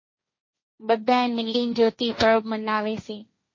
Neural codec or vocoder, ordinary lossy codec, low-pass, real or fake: codec, 16 kHz, 1.1 kbps, Voila-Tokenizer; MP3, 32 kbps; 7.2 kHz; fake